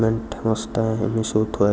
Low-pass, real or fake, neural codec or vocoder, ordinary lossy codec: none; real; none; none